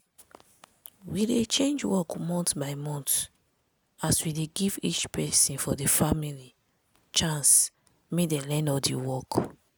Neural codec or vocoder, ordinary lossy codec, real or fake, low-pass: none; none; real; none